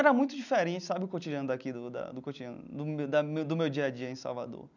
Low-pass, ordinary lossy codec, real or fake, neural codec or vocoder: 7.2 kHz; none; real; none